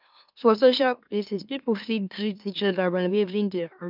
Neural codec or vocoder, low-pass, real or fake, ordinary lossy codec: autoencoder, 44.1 kHz, a latent of 192 numbers a frame, MeloTTS; 5.4 kHz; fake; none